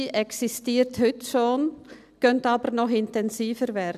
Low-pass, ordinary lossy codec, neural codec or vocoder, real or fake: 14.4 kHz; none; none; real